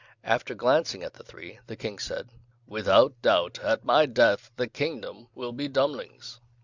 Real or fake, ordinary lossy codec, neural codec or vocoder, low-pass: real; Opus, 64 kbps; none; 7.2 kHz